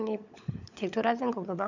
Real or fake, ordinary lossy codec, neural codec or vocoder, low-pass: fake; none; codec, 16 kHz, 16 kbps, FunCodec, trained on LibriTTS, 50 frames a second; 7.2 kHz